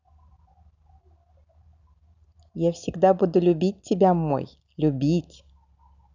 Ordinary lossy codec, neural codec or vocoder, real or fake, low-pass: none; none; real; 7.2 kHz